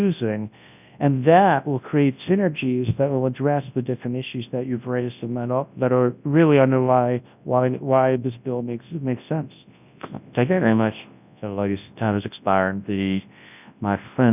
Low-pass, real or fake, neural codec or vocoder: 3.6 kHz; fake; codec, 24 kHz, 0.9 kbps, WavTokenizer, large speech release